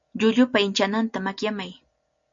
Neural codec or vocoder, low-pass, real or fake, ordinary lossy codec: none; 7.2 kHz; real; MP3, 48 kbps